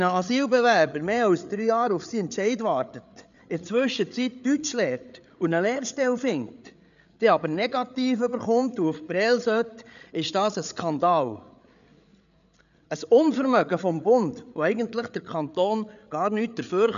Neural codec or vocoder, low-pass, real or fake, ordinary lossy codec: codec, 16 kHz, 8 kbps, FreqCodec, larger model; 7.2 kHz; fake; none